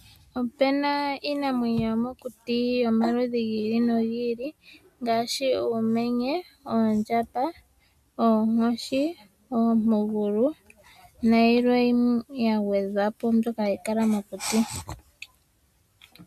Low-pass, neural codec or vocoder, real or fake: 14.4 kHz; none; real